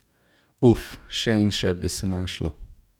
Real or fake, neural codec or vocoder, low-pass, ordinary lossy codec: fake; codec, 44.1 kHz, 2.6 kbps, DAC; 19.8 kHz; none